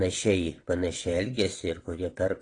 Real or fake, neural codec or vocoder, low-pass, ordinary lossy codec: fake; vocoder, 22.05 kHz, 80 mel bands, WaveNeXt; 9.9 kHz; AAC, 32 kbps